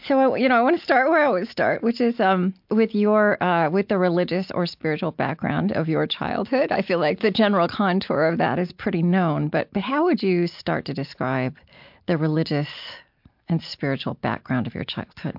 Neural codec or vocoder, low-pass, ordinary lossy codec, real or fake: none; 5.4 kHz; MP3, 48 kbps; real